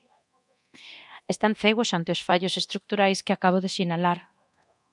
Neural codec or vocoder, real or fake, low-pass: codec, 24 kHz, 0.9 kbps, DualCodec; fake; 10.8 kHz